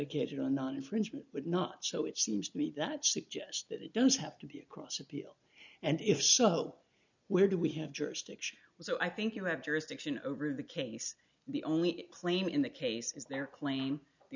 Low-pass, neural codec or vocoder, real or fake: 7.2 kHz; none; real